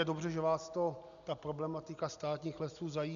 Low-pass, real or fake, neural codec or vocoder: 7.2 kHz; real; none